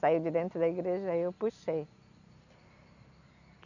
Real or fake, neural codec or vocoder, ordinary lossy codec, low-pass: real; none; none; 7.2 kHz